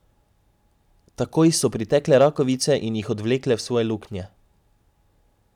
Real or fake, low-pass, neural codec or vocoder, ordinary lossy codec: real; 19.8 kHz; none; none